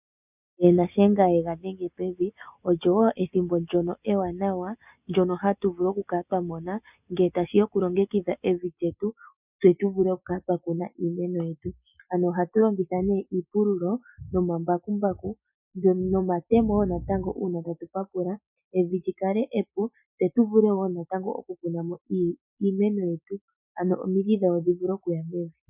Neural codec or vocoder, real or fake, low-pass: none; real; 3.6 kHz